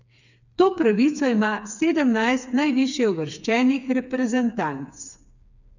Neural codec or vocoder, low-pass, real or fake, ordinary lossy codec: codec, 16 kHz, 4 kbps, FreqCodec, smaller model; 7.2 kHz; fake; none